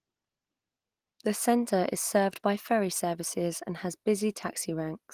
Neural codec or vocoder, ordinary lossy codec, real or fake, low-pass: none; Opus, 24 kbps; real; 14.4 kHz